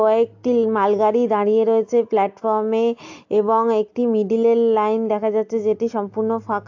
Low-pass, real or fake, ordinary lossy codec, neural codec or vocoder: 7.2 kHz; real; AAC, 48 kbps; none